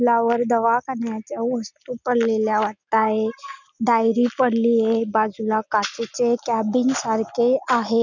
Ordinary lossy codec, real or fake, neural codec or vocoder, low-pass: none; real; none; 7.2 kHz